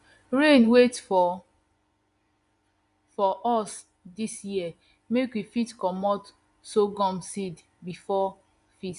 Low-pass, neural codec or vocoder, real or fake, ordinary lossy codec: 10.8 kHz; vocoder, 24 kHz, 100 mel bands, Vocos; fake; none